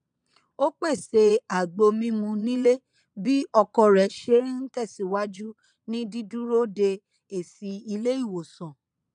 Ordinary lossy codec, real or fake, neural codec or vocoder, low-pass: none; fake; vocoder, 22.05 kHz, 80 mel bands, Vocos; 9.9 kHz